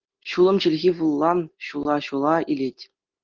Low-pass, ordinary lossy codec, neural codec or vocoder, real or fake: 7.2 kHz; Opus, 16 kbps; none; real